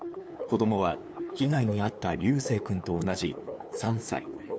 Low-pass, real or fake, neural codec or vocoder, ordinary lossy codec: none; fake; codec, 16 kHz, 2 kbps, FunCodec, trained on LibriTTS, 25 frames a second; none